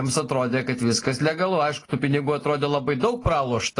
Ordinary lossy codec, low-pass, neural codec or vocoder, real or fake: AAC, 32 kbps; 10.8 kHz; none; real